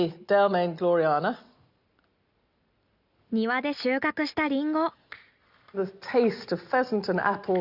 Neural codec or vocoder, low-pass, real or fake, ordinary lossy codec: none; 5.4 kHz; real; Opus, 64 kbps